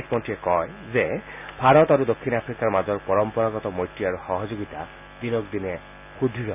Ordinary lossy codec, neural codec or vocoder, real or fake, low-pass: none; none; real; 3.6 kHz